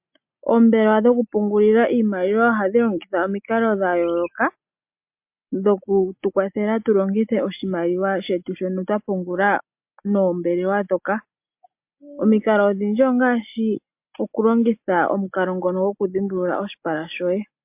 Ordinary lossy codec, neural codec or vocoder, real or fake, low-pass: MP3, 32 kbps; none; real; 3.6 kHz